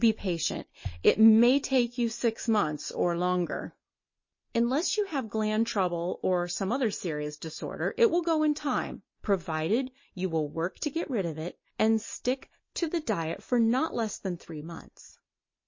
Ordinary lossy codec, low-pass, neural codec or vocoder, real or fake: MP3, 32 kbps; 7.2 kHz; none; real